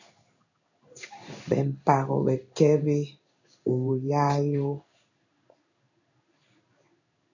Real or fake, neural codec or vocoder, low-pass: fake; codec, 16 kHz in and 24 kHz out, 1 kbps, XY-Tokenizer; 7.2 kHz